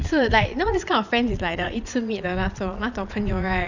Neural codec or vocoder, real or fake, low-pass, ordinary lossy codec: vocoder, 22.05 kHz, 80 mel bands, Vocos; fake; 7.2 kHz; none